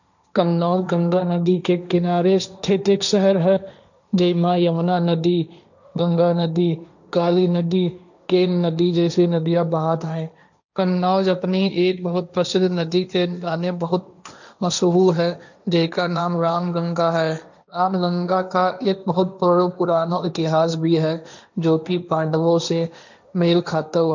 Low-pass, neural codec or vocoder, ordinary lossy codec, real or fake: 7.2 kHz; codec, 16 kHz, 1.1 kbps, Voila-Tokenizer; none; fake